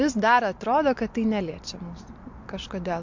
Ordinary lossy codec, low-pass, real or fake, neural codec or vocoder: MP3, 48 kbps; 7.2 kHz; real; none